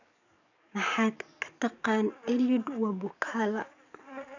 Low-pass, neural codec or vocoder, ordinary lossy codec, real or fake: 7.2 kHz; codec, 44.1 kHz, 7.8 kbps, DAC; none; fake